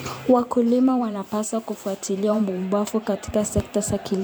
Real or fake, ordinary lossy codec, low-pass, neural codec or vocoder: fake; none; none; vocoder, 44.1 kHz, 128 mel bands every 512 samples, BigVGAN v2